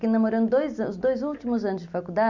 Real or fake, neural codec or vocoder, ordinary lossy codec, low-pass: real; none; none; 7.2 kHz